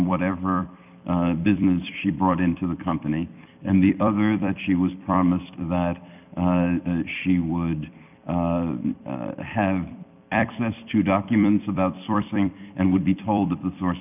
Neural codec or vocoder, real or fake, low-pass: vocoder, 44.1 kHz, 128 mel bands every 256 samples, BigVGAN v2; fake; 3.6 kHz